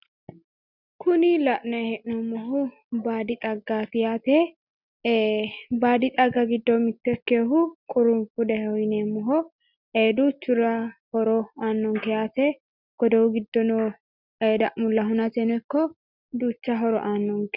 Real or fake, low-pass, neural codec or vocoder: real; 5.4 kHz; none